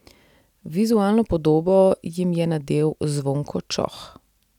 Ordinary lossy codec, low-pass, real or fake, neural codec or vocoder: none; 19.8 kHz; real; none